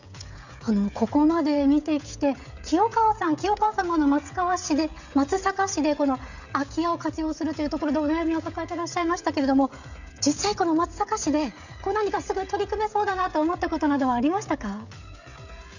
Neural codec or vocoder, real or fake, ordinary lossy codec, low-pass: codec, 16 kHz, 16 kbps, FreqCodec, smaller model; fake; none; 7.2 kHz